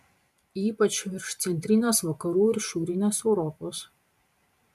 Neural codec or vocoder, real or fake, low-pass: vocoder, 44.1 kHz, 128 mel bands every 512 samples, BigVGAN v2; fake; 14.4 kHz